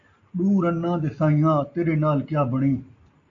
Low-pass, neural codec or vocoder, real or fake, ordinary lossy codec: 7.2 kHz; none; real; AAC, 48 kbps